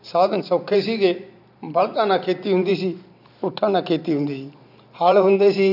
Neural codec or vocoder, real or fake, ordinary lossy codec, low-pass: vocoder, 44.1 kHz, 128 mel bands every 512 samples, BigVGAN v2; fake; none; 5.4 kHz